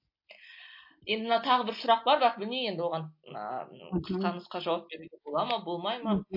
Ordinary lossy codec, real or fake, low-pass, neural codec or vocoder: MP3, 32 kbps; real; 5.4 kHz; none